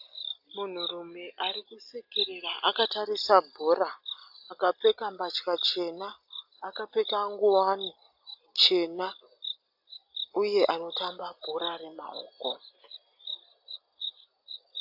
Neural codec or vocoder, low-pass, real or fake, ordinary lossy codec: none; 5.4 kHz; real; AAC, 48 kbps